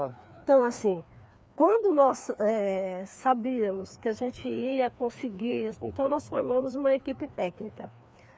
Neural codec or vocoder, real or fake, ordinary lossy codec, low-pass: codec, 16 kHz, 2 kbps, FreqCodec, larger model; fake; none; none